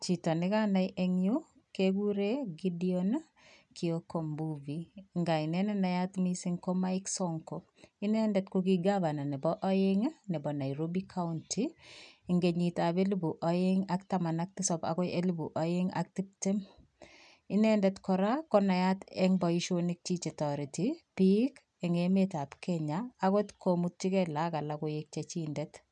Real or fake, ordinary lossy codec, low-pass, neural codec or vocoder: real; none; 9.9 kHz; none